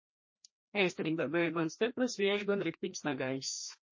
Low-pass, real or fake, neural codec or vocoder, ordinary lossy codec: 7.2 kHz; fake; codec, 16 kHz, 1 kbps, FreqCodec, larger model; MP3, 32 kbps